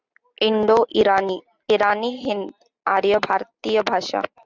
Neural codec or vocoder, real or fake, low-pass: none; real; 7.2 kHz